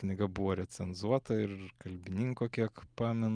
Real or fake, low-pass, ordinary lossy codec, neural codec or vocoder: real; 9.9 kHz; Opus, 32 kbps; none